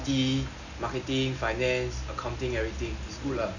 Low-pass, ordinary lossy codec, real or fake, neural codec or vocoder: 7.2 kHz; none; real; none